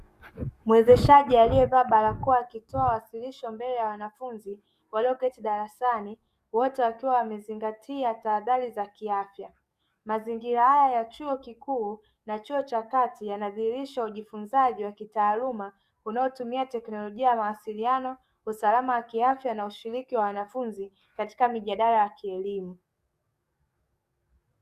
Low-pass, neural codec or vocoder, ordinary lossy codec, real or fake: 14.4 kHz; codec, 44.1 kHz, 7.8 kbps, DAC; Opus, 64 kbps; fake